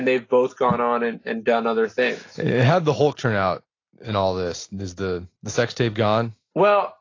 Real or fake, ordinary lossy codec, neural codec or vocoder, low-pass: real; AAC, 32 kbps; none; 7.2 kHz